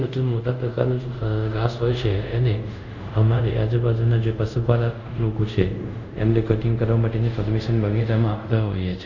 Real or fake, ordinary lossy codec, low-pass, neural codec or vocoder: fake; Opus, 64 kbps; 7.2 kHz; codec, 24 kHz, 0.5 kbps, DualCodec